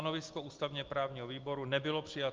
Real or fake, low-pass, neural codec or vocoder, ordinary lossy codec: real; 7.2 kHz; none; Opus, 32 kbps